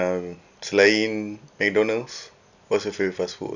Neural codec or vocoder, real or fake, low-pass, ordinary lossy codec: none; real; 7.2 kHz; none